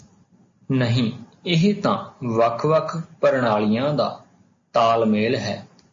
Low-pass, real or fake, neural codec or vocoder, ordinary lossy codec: 7.2 kHz; real; none; MP3, 32 kbps